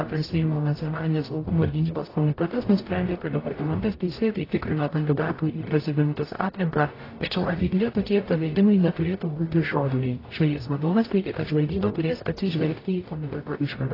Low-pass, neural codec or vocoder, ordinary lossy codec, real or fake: 5.4 kHz; codec, 44.1 kHz, 0.9 kbps, DAC; AAC, 24 kbps; fake